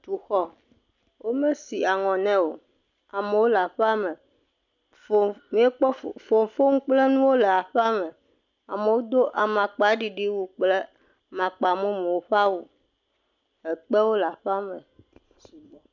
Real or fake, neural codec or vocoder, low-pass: real; none; 7.2 kHz